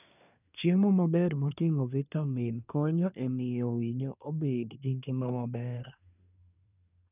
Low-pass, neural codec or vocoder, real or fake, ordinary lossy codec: 3.6 kHz; codec, 24 kHz, 1 kbps, SNAC; fake; none